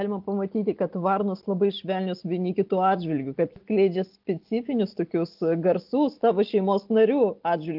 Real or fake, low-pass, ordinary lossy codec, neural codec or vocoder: real; 5.4 kHz; Opus, 32 kbps; none